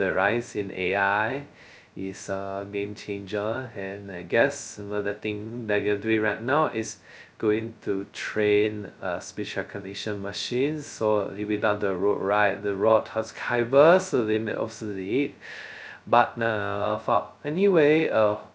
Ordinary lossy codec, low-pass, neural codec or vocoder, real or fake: none; none; codec, 16 kHz, 0.2 kbps, FocalCodec; fake